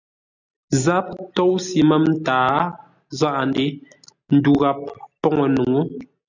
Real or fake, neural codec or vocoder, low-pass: real; none; 7.2 kHz